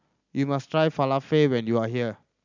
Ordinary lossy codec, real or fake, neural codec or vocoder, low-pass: none; real; none; 7.2 kHz